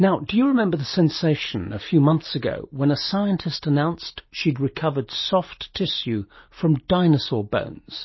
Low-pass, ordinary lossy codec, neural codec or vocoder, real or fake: 7.2 kHz; MP3, 24 kbps; none; real